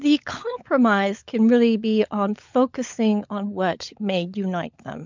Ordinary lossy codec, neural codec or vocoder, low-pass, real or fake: MP3, 64 kbps; codec, 16 kHz, 16 kbps, FunCodec, trained on LibriTTS, 50 frames a second; 7.2 kHz; fake